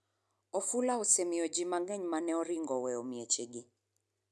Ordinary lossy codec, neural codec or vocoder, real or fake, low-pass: none; none; real; none